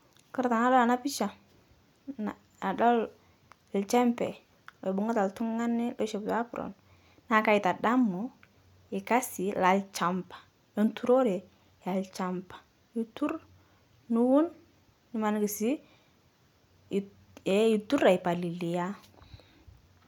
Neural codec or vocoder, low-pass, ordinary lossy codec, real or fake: none; 19.8 kHz; none; real